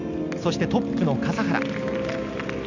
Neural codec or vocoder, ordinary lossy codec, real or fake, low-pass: none; none; real; 7.2 kHz